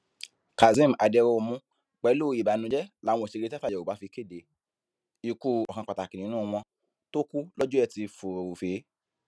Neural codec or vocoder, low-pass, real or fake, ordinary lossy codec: none; none; real; none